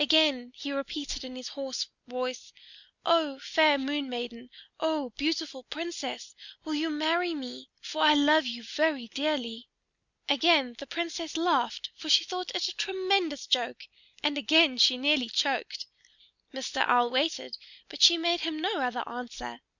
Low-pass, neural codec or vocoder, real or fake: 7.2 kHz; none; real